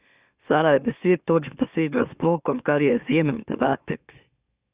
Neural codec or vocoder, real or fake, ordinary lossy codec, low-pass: autoencoder, 44.1 kHz, a latent of 192 numbers a frame, MeloTTS; fake; Opus, 64 kbps; 3.6 kHz